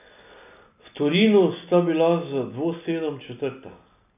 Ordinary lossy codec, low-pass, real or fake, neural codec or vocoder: none; 3.6 kHz; real; none